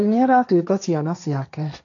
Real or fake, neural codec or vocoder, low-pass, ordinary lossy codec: fake; codec, 16 kHz, 1.1 kbps, Voila-Tokenizer; 7.2 kHz; none